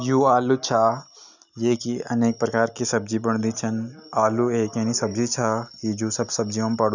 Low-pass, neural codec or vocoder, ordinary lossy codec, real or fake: 7.2 kHz; none; none; real